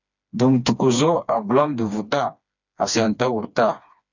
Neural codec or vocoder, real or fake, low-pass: codec, 16 kHz, 2 kbps, FreqCodec, smaller model; fake; 7.2 kHz